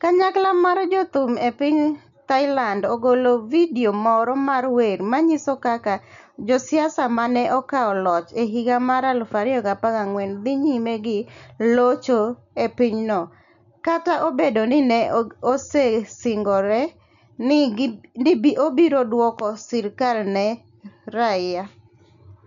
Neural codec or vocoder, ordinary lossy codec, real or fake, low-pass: none; none; real; 7.2 kHz